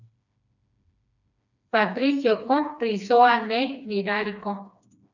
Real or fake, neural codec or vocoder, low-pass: fake; codec, 16 kHz, 2 kbps, FreqCodec, smaller model; 7.2 kHz